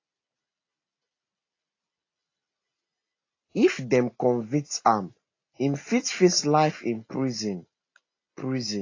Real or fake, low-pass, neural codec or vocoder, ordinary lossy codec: real; 7.2 kHz; none; AAC, 32 kbps